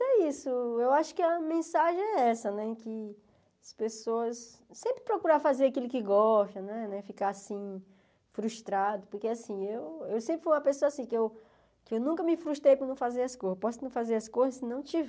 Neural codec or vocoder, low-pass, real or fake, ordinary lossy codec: none; none; real; none